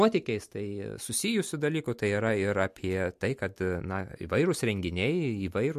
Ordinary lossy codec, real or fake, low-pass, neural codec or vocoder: MP3, 64 kbps; real; 14.4 kHz; none